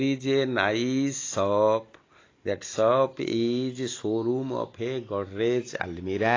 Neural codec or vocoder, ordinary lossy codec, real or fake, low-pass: none; AAC, 32 kbps; real; 7.2 kHz